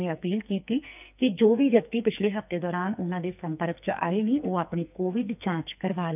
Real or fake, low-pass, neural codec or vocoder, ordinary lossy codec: fake; 3.6 kHz; codec, 44.1 kHz, 2.6 kbps, SNAC; none